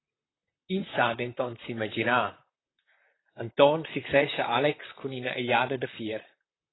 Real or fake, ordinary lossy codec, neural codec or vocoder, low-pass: fake; AAC, 16 kbps; vocoder, 44.1 kHz, 128 mel bands, Pupu-Vocoder; 7.2 kHz